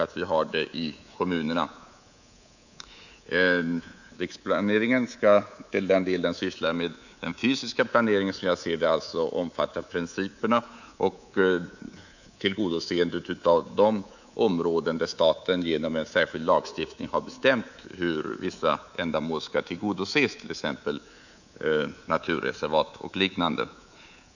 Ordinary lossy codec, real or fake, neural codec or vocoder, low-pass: none; fake; codec, 24 kHz, 3.1 kbps, DualCodec; 7.2 kHz